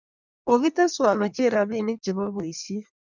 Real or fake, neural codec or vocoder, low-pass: fake; codec, 16 kHz in and 24 kHz out, 1.1 kbps, FireRedTTS-2 codec; 7.2 kHz